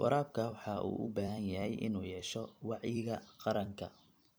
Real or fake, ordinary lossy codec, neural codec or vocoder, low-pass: fake; none; vocoder, 44.1 kHz, 128 mel bands every 256 samples, BigVGAN v2; none